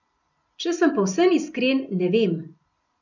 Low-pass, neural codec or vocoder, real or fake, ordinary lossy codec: 7.2 kHz; none; real; none